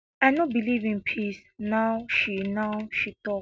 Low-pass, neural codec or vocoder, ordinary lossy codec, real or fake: none; none; none; real